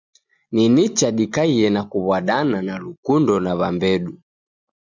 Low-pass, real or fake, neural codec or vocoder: 7.2 kHz; real; none